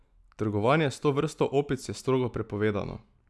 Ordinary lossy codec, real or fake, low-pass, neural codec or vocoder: none; real; none; none